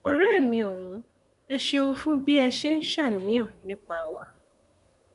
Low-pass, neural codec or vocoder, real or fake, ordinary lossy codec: 10.8 kHz; codec, 24 kHz, 1 kbps, SNAC; fake; none